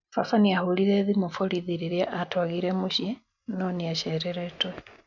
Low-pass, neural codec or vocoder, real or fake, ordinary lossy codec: 7.2 kHz; none; real; none